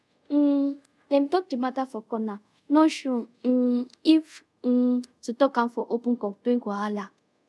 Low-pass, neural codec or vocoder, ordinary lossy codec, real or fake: none; codec, 24 kHz, 0.5 kbps, DualCodec; none; fake